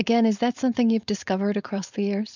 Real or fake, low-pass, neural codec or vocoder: fake; 7.2 kHz; codec, 16 kHz, 4.8 kbps, FACodec